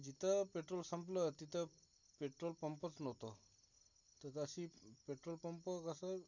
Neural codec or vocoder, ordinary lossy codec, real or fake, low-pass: none; none; real; 7.2 kHz